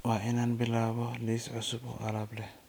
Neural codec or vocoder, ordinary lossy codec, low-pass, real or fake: none; none; none; real